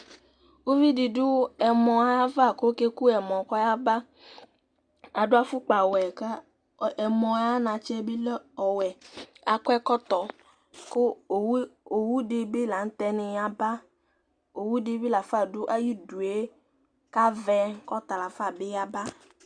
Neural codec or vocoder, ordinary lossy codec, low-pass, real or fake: none; Opus, 64 kbps; 9.9 kHz; real